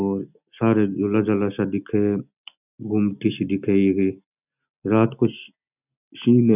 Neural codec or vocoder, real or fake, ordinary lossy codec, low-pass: none; real; none; 3.6 kHz